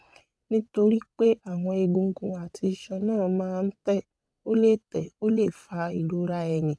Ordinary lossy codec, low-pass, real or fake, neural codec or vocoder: none; none; fake; vocoder, 22.05 kHz, 80 mel bands, WaveNeXt